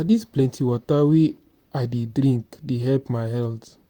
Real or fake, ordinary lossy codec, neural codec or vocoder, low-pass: fake; Opus, 64 kbps; vocoder, 44.1 kHz, 128 mel bands, Pupu-Vocoder; 19.8 kHz